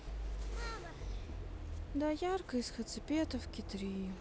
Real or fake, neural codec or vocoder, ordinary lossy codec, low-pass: real; none; none; none